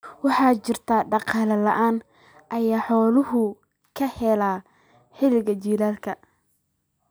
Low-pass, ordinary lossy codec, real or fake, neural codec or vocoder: none; none; real; none